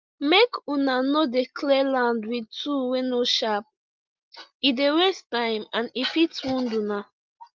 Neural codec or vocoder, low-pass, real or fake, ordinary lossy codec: none; 7.2 kHz; real; Opus, 32 kbps